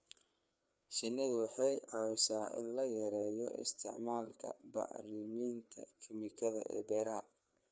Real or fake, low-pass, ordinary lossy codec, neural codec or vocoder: fake; none; none; codec, 16 kHz, 4 kbps, FreqCodec, larger model